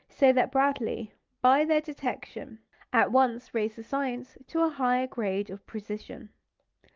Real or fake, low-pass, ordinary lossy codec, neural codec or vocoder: real; 7.2 kHz; Opus, 32 kbps; none